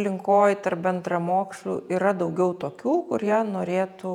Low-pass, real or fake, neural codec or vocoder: 19.8 kHz; fake; vocoder, 48 kHz, 128 mel bands, Vocos